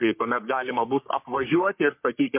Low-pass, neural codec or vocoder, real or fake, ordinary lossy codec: 3.6 kHz; codec, 44.1 kHz, 3.4 kbps, Pupu-Codec; fake; MP3, 32 kbps